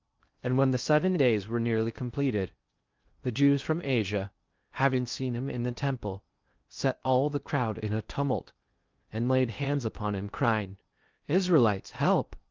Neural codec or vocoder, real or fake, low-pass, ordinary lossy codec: codec, 16 kHz in and 24 kHz out, 0.6 kbps, FocalCodec, streaming, 2048 codes; fake; 7.2 kHz; Opus, 32 kbps